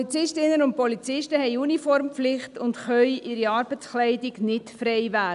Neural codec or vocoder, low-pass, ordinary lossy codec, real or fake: none; 10.8 kHz; none; real